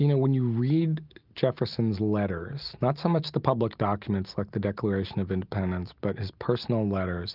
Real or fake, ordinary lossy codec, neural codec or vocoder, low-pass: real; Opus, 24 kbps; none; 5.4 kHz